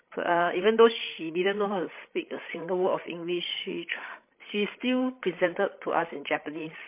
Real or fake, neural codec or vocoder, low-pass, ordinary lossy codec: fake; codec, 16 kHz, 16 kbps, FreqCodec, larger model; 3.6 kHz; MP3, 24 kbps